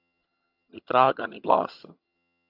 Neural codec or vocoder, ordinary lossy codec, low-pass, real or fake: vocoder, 22.05 kHz, 80 mel bands, HiFi-GAN; AAC, 48 kbps; 5.4 kHz; fake